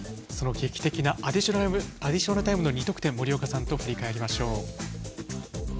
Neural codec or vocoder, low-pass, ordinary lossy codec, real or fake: none; none; none; real